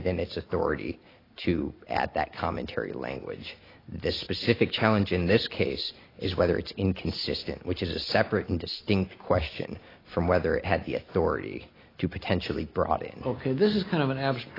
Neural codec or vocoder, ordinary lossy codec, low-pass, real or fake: none; AAC, 24 kbps; 5.4 kHz; real